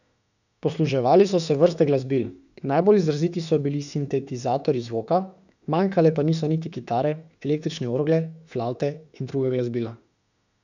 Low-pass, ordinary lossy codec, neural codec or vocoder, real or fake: 7.2 kHz; none; autoencoder, 48 kHz, 32 numbers a frame, DAC-VAE, trained on Japanese speech; fake